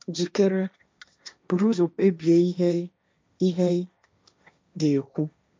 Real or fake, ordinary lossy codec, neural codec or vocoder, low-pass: fake; none; codec, 16 kHz, 1.1 kbps, Voila-Tokenizer; none